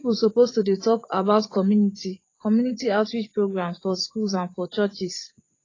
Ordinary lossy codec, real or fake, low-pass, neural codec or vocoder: AAC, 32 kbps; fake; 7.2 kHz; vocoder, 24 kHz, 100 mel bands, Vocos